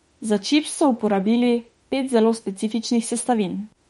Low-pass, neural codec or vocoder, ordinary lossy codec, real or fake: 19.8 kHz; autoencoder, 48 kHz, 32 numbers a frame, DAC-VAE, trained on Japanese speech; MP3, 48 kbps; fake